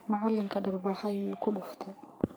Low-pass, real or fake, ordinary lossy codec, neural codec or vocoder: none; fake; none; codec, 44.1 kHz, 3.4 kbps, Pupu-Codec